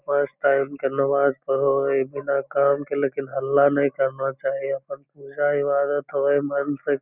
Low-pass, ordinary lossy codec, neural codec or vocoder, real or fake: 3.6 kHz; none; none; real